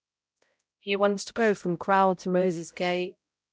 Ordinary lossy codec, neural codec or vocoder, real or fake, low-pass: none; codec, 16 kHz, 0.5 kbps, X-Codec, HuBERT features, trained on balanced general audio; fake; none